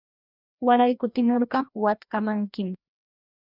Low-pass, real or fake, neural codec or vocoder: 5.4 kHz; fake; codec, 16 kHz, 1 kbps, FreqCodec, larger model